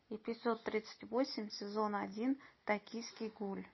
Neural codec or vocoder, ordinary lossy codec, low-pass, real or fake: none; MP3, 24 kbps; 7.2 kHz; real